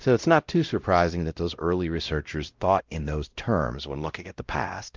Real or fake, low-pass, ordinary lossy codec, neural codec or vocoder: fake; 7.2 kHz; Opus, 24 kbps; codec, 16 kHz, 0.5 kbps, X-Codec, WavLM features, trained on Multilingual LibriSpeech